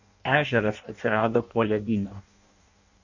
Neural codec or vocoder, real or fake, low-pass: codec, 16 kHz in and 24 kHz out, 0.6 kbps, FireRedTTS-2 codec; fake; 7.2 kHz